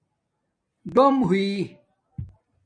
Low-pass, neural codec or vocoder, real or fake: 9.9 kHz; none; real